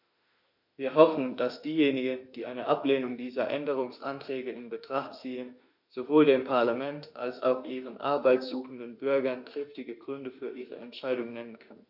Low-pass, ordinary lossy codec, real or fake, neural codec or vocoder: 5.4 kHz; none; fake; autoencoder, 48 kHz, 32 numbers a frame, DAC-VAE, trained on Japanese speech